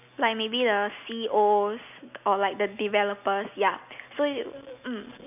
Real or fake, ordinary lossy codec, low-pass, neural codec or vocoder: real; none; 3.6 kHz; none